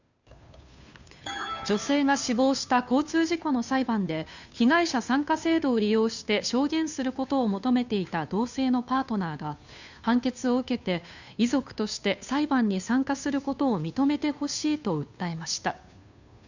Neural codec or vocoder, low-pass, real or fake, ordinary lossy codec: codec, 16 kHz, 2 kbps, FunCodec, trained on Chinese and English, 25 frames a second; 7.2 kHz; fake; none